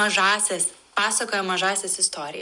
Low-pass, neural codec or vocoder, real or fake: 10.8 kHz; none; real